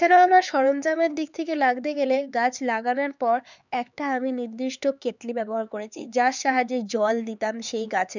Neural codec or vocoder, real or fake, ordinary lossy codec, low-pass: codec, 16 kHz, 4 kbps, X-Codec, HuBERT features, trained on LibriSpeech; fake; none; 7.2 kHz